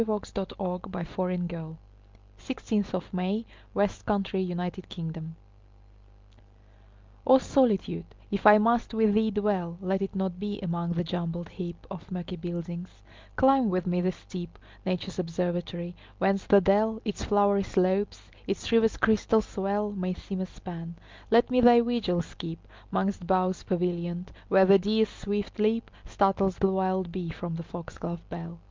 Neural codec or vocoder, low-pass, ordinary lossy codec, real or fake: none; 7.2 kHz; Opus, 24 kbps; real